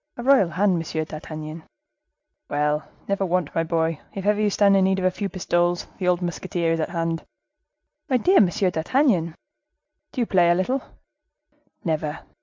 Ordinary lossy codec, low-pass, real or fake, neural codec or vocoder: MP3, 64 kbps; 7.2 kHz; real; none